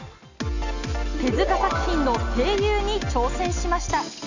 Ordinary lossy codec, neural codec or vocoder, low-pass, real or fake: none; none; 7.2 kHz; real